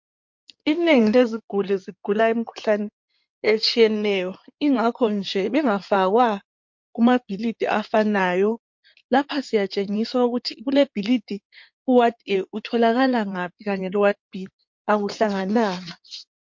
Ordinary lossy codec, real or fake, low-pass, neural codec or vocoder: MP3, 48 kbps; fake; 7.2 kHz; codec, 16 kHz in and 24 kHz out, 2.2 kbps, FireRedTTS-2 codec